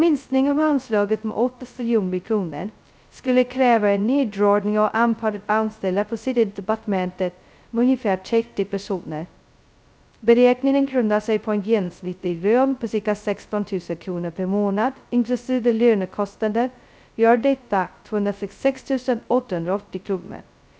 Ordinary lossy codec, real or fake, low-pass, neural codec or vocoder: none; fake; none; codec, 16 kHz, 0.2 kbps, FocalCodec